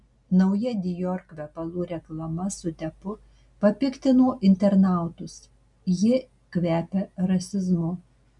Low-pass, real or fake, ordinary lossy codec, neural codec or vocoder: 10.8 kHz; real; MP3, 96 kbps; none